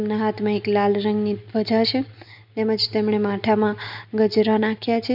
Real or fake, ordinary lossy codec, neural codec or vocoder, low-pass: real; none; none; 5.4 kHz